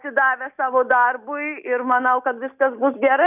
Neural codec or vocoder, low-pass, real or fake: none; 3.6 kHz; real